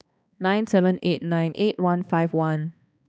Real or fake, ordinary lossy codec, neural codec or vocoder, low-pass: fake; none; codec, 16 kHz, 2 kbps, X-Codec, HuBERT features, trained on balanced general audio; none